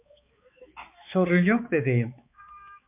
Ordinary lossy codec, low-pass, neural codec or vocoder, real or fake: MP3, 32 kbps; 3.6 kHz; codec, 16 kHz, 4 kbps, X-Codec, HuBERT features, trained on balanced general audio; fake